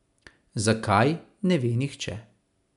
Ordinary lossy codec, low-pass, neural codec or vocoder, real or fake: none; 10.8 kHz; none; real